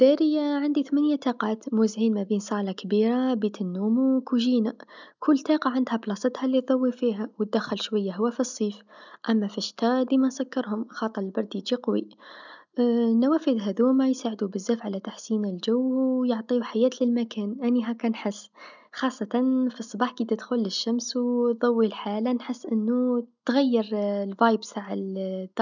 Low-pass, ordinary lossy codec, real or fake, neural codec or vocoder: 7.2 kHz; none; real; none